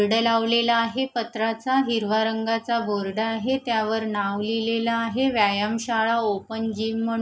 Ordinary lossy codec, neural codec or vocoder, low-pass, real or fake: none; none; none; real